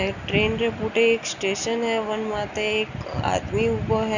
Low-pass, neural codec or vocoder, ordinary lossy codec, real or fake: 7.2 kHz; none; none; real